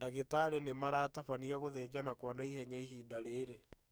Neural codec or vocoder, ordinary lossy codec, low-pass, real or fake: codec, 44.1 kHz, 2.6 kbps, SNAC; none; none; fake